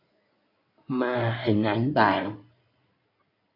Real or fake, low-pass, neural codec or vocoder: fake; 5.4 kHz; codec, 16 kHz in and 24 kHz out, 2.2 kbps, FireRedTTS-2 codec